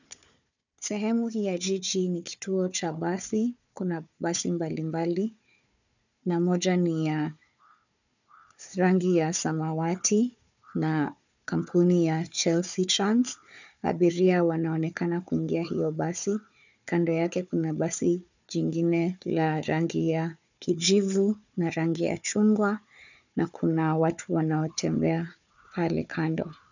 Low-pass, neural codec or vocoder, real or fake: 7.2 kHz; codec, 16 kHz, 4 kbps, FunCodec, trained on Chinese and English, 50 frames a second; fake